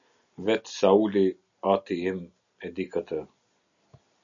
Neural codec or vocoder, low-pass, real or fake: none; 7.2 kHz; real